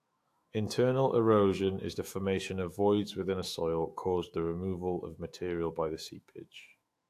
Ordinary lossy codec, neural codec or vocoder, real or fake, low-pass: AAC, 64 kbps; autoencoder, 48 kHz, 128 numbers a frame, DAC-VAE, trained on Japanese speech; fake; 14.4 kHz